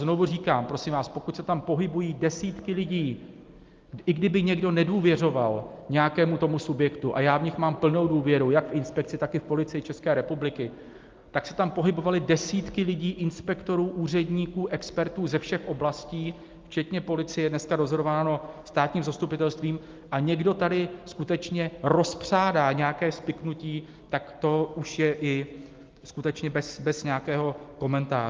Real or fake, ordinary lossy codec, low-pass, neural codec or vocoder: real; Opus, 32 kbps; 7.2 kHz; none